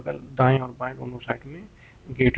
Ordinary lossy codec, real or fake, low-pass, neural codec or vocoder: none; real; none; none